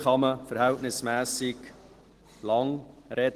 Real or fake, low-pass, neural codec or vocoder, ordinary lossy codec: real; 14.4 kHz; none; Opus, 16 kbps